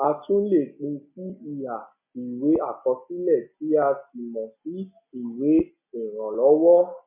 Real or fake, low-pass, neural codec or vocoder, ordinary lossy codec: real; 3.6 kHz; none; MP3, 32 kbps